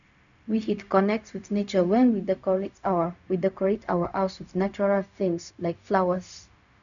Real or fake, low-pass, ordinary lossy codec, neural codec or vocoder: fake; 7.2 kHz; none; codec, 16 kHz, 0.4 kbps, LongCat-Audio-Codec